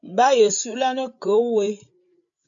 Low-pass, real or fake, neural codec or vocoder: 7.2 kHz; fake; codec, 16 kHz, 8 kbps, FreqCodec, larger model